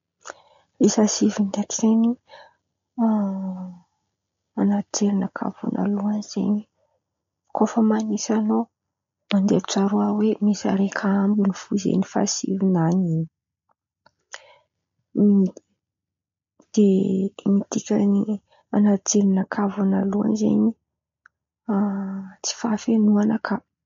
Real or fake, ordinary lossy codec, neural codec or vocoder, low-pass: real; MP3, 48 kbps; none; 7.2 kHz